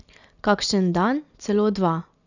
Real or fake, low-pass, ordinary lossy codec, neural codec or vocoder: real; 7.2 kHz; none; none